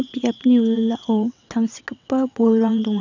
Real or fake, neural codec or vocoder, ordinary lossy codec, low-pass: fake; vocoder, 22.05 kHz, 80 mel bands, WaveNeXt; none; 7.2 kHz